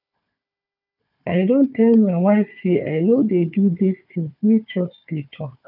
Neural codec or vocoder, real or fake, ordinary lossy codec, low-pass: codec, 16 kHz, 4 kbps, FunCodec, trained on Chinese and English, 50 frames a second; fake; none; 5.4 kHz